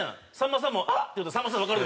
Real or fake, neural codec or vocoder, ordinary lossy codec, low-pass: real; none; none; none